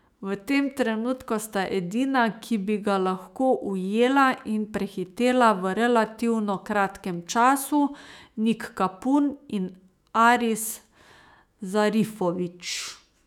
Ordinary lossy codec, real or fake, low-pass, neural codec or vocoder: none; fake; 19.8 kHz; autoencoder, 48 kHz, 128 numbers a frame, DAC-VAE, trained on Japanese speech